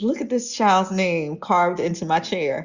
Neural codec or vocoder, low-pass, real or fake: none; 7.2 kHz; real